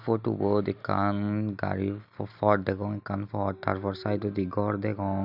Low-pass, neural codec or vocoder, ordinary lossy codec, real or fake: 5.4 kHz; none; none; real